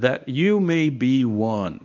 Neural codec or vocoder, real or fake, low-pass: codec, 24 kHz, 0.9 kbps, WavTokenizer, medium speech release version 1; fake; 7.2 kHz